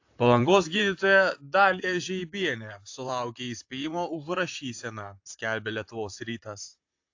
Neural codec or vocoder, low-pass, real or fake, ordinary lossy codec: vocoder, 44.1 kHz, 128 mel bands, Pupu-Vocoder; 7.2 kHz; fake; AAC, 48 kbps